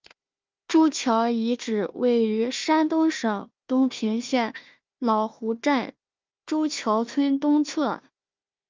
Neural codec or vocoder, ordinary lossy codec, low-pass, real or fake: codec, 16 kHz, 1 kbps, FunCodec, trained on Chinese and English, 50 frames a second; Opus, 24 kbps; 7.2 kHz; fake